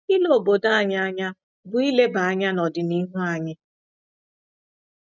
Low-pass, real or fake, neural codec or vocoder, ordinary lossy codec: 7.2 kHz; real; none; none